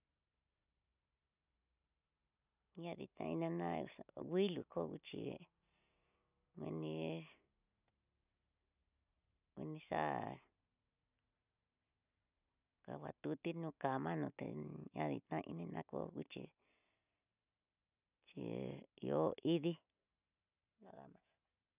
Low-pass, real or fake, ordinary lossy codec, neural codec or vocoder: 3.6 kHz; real; none; none